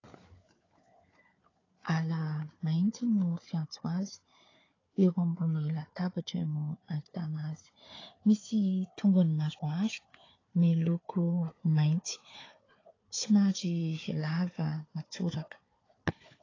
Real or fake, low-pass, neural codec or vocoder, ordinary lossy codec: fake; 7.2 kHz; codec, 16 kHz, 4 kbps, FunCodec, trained on Chinese and English, 50 frames a second; AAC, 32 kbps